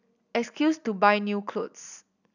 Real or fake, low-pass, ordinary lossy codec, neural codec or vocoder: real; 7.2 kHz; none; none